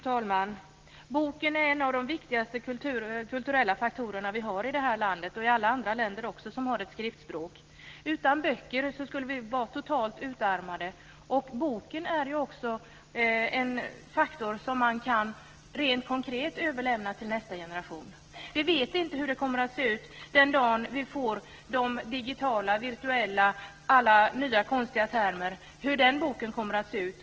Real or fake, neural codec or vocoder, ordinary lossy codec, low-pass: real; none; Opus, 16 kbps; 7.2 kHz